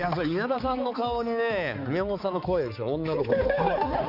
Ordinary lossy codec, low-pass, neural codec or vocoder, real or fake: none; 5.4 kHz; codec, 16 kHz, 4 kbps, X-Codec, HuBERT features, trained on balanced general audio; fake